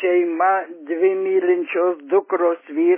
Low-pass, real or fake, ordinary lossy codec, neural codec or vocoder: 3.6 kHz; real; MP3, 16 kbps; none